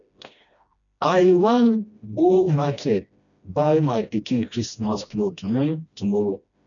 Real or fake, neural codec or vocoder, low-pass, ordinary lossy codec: fake; codec, 16 kHz, 1 kbps, FreqCodec, smaller model; 7.2 kHz; none